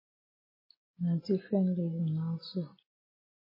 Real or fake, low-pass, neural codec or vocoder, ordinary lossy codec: real; 5.4 kHz; none; MP3, 24 kbps